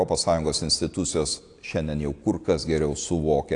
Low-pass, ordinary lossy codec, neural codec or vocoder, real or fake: 9.9 kHz; AAC, 48 kbps; none; real